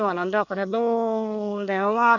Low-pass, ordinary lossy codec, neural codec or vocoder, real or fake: 7.2 kHz; none; codec, 24 kHz, 1 kbps, SNAC; fake